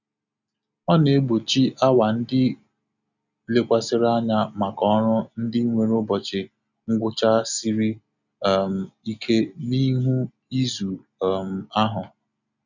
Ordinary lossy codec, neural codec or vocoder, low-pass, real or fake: none; none; 7.2 kHz; real